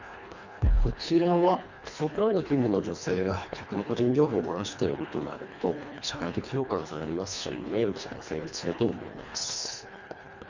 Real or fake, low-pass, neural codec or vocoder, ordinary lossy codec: fake; 7.2 kHz; codec, 24 kHz, 1.5 kbps, HILCodec; Opus, 64 kbps